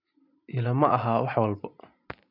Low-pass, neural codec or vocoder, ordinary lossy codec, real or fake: 5.4 kHz; none; MP3, 48 kbps; real